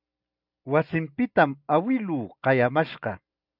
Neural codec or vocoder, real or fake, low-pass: none; real; 5.4 kHz